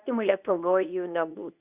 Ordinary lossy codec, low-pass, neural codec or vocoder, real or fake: Opus, 64 kbps; 3.6 kHz; codec, 24 kHz, 0.9 kbps, WavTokenizer, medium speech release version 2; fake